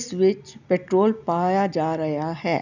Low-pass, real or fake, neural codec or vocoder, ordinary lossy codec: 7.2 kHz; real; none; none